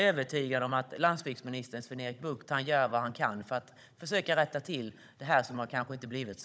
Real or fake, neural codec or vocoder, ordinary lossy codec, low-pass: fake; codec, 16 kHz, 16 kbps, FunCodec, trained on Chinese and English, 50 frames a second; none; none